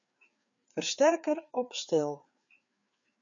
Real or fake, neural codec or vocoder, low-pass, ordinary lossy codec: fake; codec, 16 kHz, 4 kbps, FreqCodec, larger model; 7.2 kHz; MP3, 64 kbps